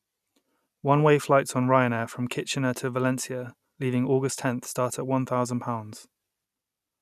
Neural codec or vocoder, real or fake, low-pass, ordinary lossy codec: none; real; 14.4 kHz; none